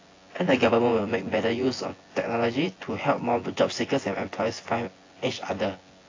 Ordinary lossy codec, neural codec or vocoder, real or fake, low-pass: AAC, 32 kbps; vocoder, 24 kHz, 100 mel bands, Vocos; fake; 7.2 kHz